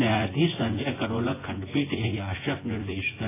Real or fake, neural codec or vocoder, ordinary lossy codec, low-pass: fake; vocoder, 24 kHz, 100 mel bands, Vocos; AAC, 16 kbps; 3.6 kHz